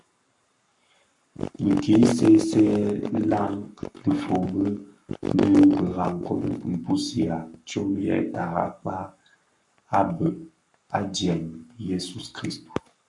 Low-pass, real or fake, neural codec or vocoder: 10.8 kHz; fake; codec, 44.1 kHz, 7.8 kbps, Pupu-Codec